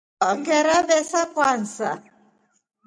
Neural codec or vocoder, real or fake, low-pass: none; real; 9.9 kHz